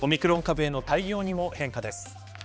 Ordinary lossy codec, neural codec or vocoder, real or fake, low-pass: none; codec, 16 kHz, 2 kbps, X-Codec, HuBERT features, trained on balanced general audio; fake; none